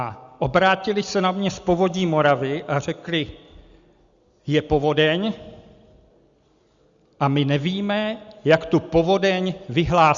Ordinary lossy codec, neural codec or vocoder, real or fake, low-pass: Opus, 64 kbps; none; real; 7.2 kHz